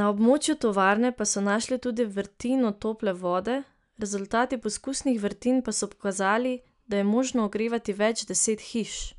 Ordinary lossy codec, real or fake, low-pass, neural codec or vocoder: none; real; 10.8 kHz; none